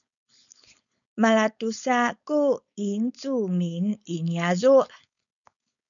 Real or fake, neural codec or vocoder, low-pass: fake; codec, 16 kHz, 4.8 kbps, FACodec; 7.2 kHz